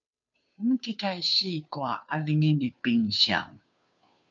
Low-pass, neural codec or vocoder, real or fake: 7.2 kHz; codec, 16 kHz, 2 kbps, FunCodec, trained on Chinese and English, 25 frames a second; fake